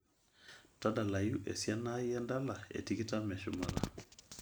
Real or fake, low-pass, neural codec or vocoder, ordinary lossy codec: real; none; none; none